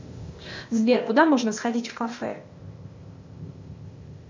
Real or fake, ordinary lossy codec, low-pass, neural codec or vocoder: fake; MP3, 64 kbps; 7.2 kHz; codec, 16 kHz, 0.8 kbps, ZipCodec